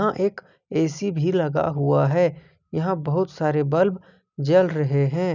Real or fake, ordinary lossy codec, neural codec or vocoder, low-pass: real; none; none; 7.2 kHz